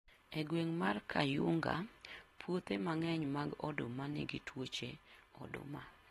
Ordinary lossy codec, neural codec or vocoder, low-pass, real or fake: AAC, 32 kbps; none; 19.8 kHz; real